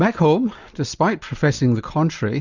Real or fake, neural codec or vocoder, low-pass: real; none; 7.2 kHz